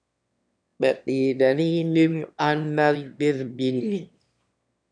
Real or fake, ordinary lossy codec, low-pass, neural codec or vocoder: fake; MP3, 96 kbps; 9.9 kHz; autoencoder, 22.05 kHz, a latent of 192 numbers a frame, VITS, trained on one speaker